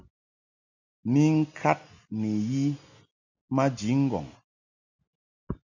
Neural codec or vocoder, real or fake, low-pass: none; real; 7.2 kHz